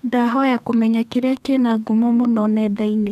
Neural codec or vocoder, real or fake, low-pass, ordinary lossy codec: codec, 32 kHz, 1.9 kbps, SNAC; fake; 14.4 kHz; none